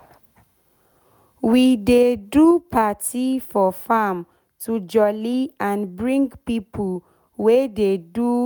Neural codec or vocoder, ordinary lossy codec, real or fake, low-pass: none; none; real; none